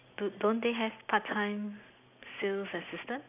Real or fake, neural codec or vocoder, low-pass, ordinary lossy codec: real; none; 3.6 kHz; none